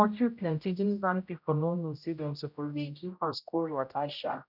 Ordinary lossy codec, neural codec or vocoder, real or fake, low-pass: none; codec, 16 kHz, 0.5 kbps, X-Codec, HuBERT features, trained on general audio; fake; 5.4 kHz